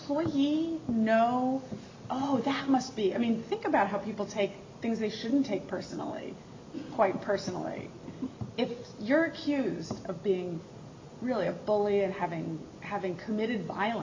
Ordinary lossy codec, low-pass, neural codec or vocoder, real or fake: MP3, 64 kbps; 7.2 kHz; none; real